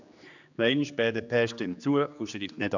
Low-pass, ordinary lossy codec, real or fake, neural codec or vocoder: 7.2 kHz; none; fake; codec, 16 kHz, 2 kbps, X-Codec, HuBERT features, trained on general audio